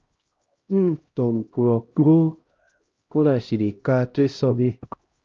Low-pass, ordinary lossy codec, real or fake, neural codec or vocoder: 7.2 kHz; Opus, 24 kbps; fake; codec, 16 kHz, 0.5 kbps, X-Codec, HuBERT features, trained on LibriSpeech